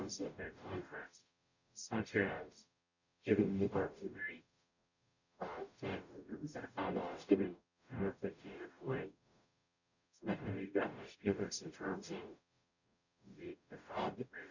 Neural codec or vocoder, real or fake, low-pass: codec, 44.1 kHz, 0.9 kbps, DAC; fake; 7.2 kHz